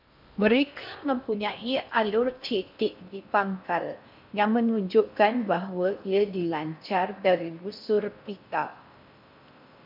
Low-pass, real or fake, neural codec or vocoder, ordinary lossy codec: 5.4 kHz; fake; codec, 16 kHz in and 24 kHz out, 0.6 kbps, FocalCodec, streaming, 4096 codes; MP3, 48 kbps